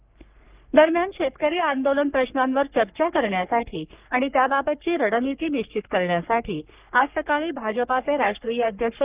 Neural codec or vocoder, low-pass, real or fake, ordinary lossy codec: codec, 44.1 kHz, 3.4 kbps, Pupu-Codec; 3.6 kHz; fake; Opus, 24 kbps